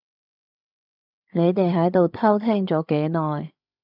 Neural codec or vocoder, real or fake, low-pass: codec, 16 kHz, 16 kbps, FreqCodec, larger model; fake; 5.4 kHz